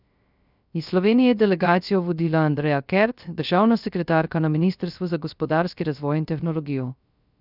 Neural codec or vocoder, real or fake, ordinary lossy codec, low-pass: codec, 16 kHz, 0.3 kbps, FocalCodec; fake; none; 5.4 kHz